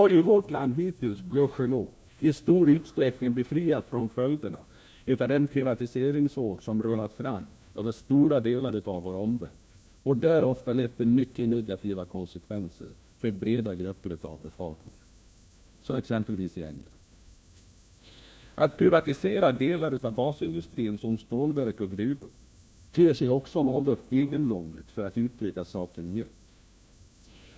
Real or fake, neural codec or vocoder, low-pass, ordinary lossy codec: fake; codec, 16 kHz, 1 kbps, FunCodec, trained on LibriTTS, 50 frames a second; none; none